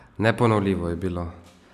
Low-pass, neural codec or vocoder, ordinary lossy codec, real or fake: 14.4 kHz; none; none; real